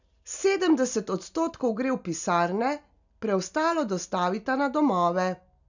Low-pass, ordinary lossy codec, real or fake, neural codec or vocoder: 7.2 kHz; none; real; none